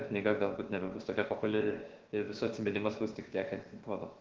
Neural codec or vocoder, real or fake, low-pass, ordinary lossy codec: codec, 16 kHz, about 1 kbps, DyCAST, with the encoder's durations; fake; 7.2 kHz; Opus, 16 kbps